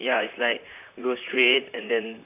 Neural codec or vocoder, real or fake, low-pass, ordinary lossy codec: vocoder, 44.1 kHz, 128 mel bands, Pupu-Vocoder; fake; 3.6 kHz; AAC, 24 kbps